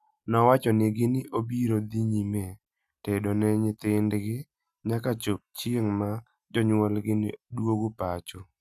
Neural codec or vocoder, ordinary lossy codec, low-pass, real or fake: none; none; 14.4 kHz; real